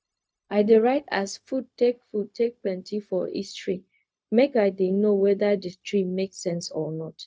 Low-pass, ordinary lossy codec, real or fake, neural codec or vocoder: none; none; fake; codec, 16 kHz, 0.4 kbps, LongCat-Audio-Codec